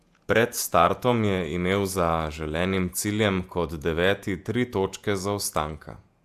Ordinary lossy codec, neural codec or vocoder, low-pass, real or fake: AAC, 96 kbps; vocoder, 48 kHz, 128 mel bands, Vocos; 14.4 kHz; fake